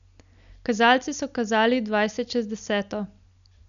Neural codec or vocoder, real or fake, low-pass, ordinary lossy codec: none; real; 7.2 kHz; none